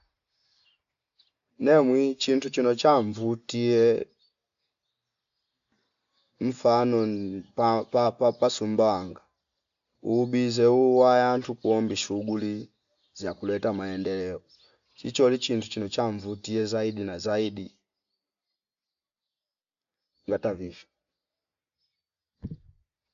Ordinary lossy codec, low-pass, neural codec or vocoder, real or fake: MP3, 64 kbps; 7.2 kHz; none; real